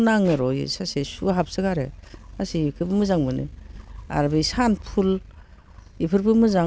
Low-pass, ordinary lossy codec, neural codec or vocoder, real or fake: none; none; none; real